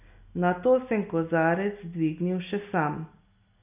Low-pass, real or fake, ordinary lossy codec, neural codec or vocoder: 3.6 kHz; real; none; none